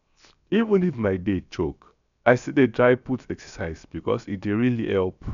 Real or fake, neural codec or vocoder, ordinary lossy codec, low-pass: fake; codec, 16 kHz, 0.7 kbps, FocalCodec; none; 7.2 kHz